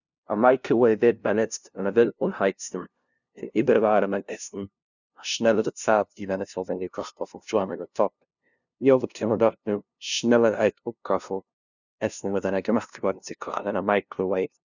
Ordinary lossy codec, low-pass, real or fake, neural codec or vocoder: none; 7.2 kHz; fake; codec, 16 kHz, 0.5 kbps, FunCodec, trained on LibriTTS, 25 frames a second